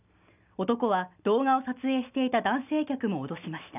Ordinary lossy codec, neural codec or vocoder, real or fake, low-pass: none; none; real; 3.6 kHz